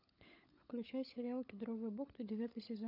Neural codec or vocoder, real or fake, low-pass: codec, 16 kHz, 16 kbps, FunCodec, trained on LibriTTS, 50 frames a second; fake; 5.4 kHz